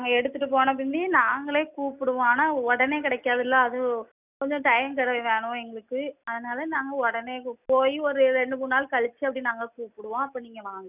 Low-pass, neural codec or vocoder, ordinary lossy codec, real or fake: 3.6 kHz; none; none; real